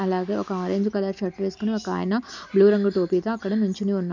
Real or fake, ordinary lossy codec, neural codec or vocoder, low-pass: real; none; none; 7.2 kHz